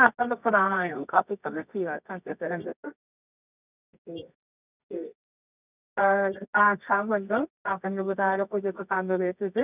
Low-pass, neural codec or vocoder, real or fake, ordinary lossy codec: 3.6 kHz; codec, 24 kHz, 0.9 kbps, WavTokenizer, medium music audio release; fake; none